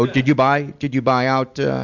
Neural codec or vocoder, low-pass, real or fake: none; 7.2 kHz; real